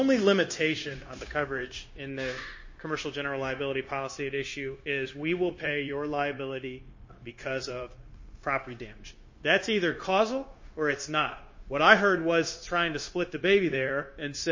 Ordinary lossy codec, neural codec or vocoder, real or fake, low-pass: MP3, 32 kbps; codec, 16 kHz, 0.9 kbps, LongCat-Audio-Codec; fake; 7.2 kHz